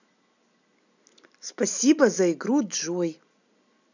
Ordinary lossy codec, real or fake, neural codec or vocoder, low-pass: none; real; none; 7.2 kHz